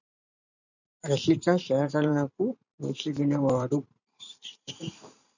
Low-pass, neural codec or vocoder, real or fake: 7.2 kHz; vocoder, 44.1 kHz, 128 mel bands every 512 samples, BigVGAN v2; fake